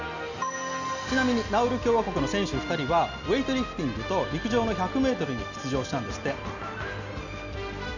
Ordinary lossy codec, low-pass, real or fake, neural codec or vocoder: none; 7.2 kHz; real; none